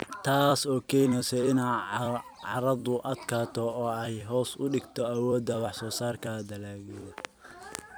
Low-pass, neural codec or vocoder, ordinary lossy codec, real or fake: none; vocoder, 44.1 kHz, 128 mel bands every 256 samples, BigVGAN v2; none; fake